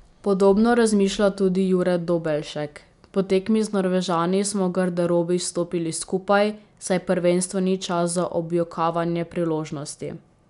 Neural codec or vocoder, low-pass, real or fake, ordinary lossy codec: none; 10.8 kHz; real; none